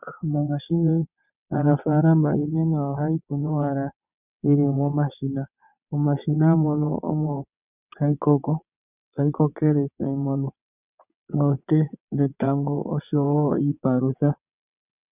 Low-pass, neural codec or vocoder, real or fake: 3.6 kHz; vocoder, 22.05 kHz, 80 mel bands, WaveNeXt; fake